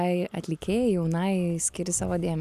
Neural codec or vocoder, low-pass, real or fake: none; 14.4 kHz; real